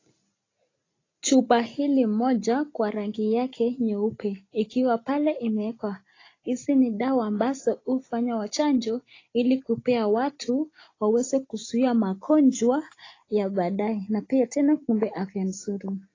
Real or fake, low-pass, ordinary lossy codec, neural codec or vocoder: real; 7.2 kHz; AAC, 32 kbps; none